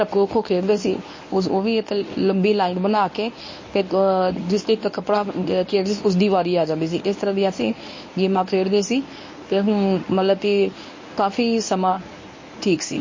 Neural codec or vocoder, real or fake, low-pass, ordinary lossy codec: codec, 24 kHz, 0.9 kbps, WavTokenizer, medium speech release version 1; fake; 7.2 kHz; MP3, 32 kbps